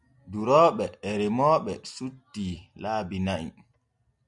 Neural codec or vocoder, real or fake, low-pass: none; real; 10.8 kHz